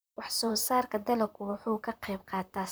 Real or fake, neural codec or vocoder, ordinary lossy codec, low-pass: fake; vocoder, 44.1 kHz, 128 mel bands, Pupu-Vocoder; none; none